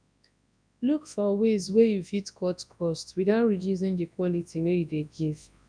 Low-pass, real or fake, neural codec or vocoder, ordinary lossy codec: 9.9 kHz; fake; codec, 24 kHz, 0.9 kbps, WavTokenizer, large speech release; none